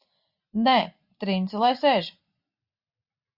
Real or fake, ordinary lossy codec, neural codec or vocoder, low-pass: real; Opus, 64 kbps; none; 5.4 kHz